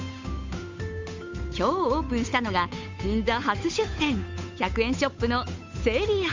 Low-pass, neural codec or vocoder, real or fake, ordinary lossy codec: 7.2 kHz; codec, 16 kHz, 8 kbps, FunCodec, trained on Chinese and English, 25 frames a second; fake; MP3, 64 kbps